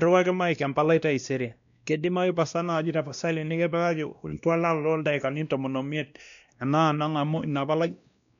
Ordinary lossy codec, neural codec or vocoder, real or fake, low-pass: MP3, 96 kbps; codec, 16 kHz, 1 kbps, X-Codec, WavLM features, trained on Multilingual LibriSpeech; fake; 7.2 kHz